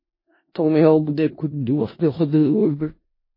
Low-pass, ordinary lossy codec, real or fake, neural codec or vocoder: 5.4 kHz; MP3, 24 kbps; fake; codec, 16 kHz in and 24 kHz out, 0.4 kbps, LongCat-Audio-Codec, four codebook decoder